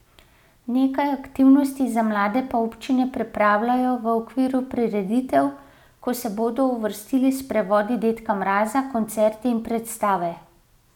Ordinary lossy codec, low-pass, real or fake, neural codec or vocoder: none; 19.8 kHz; real; none